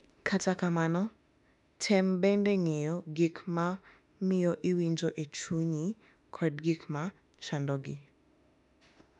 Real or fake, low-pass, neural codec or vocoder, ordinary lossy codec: fake; 10.8 kHz; autoencoder, 48 kHz, 32 numbers a frame, DAC-VAE, trained on Japanese speech; none